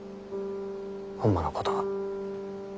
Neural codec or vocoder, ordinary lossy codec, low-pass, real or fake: none; none; none; real